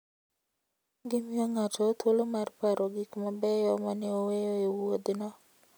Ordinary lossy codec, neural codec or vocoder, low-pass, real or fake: none; none; none; real